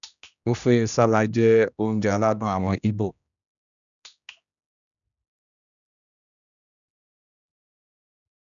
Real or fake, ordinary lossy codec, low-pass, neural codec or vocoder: fake; none; 7.2 kHz; codec, 16 kHz, 1 kbps, X-Codec, HuBERT features, trained on general audio